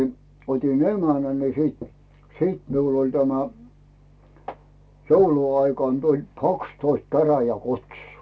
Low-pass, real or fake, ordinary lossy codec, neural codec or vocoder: none; real; none; none